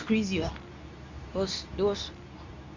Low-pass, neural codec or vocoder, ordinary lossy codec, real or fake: 7.2 kHz; codec, 24 kHz, 0.9 kbps, WavTokenizer, medium speech release version 2; none; fake